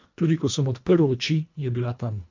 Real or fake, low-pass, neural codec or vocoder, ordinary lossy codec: fake; 7.2 kHz; codec, 24 kHz, 1.5 kbps, HILCodec; MP3, 64 kbps